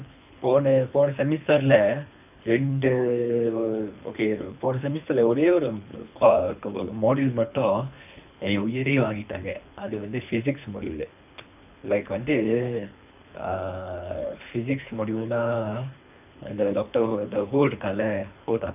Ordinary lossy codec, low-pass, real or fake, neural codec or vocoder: none; 3.6 kHz; fake; codec, 24 kHz, 3 kbps, HILCodec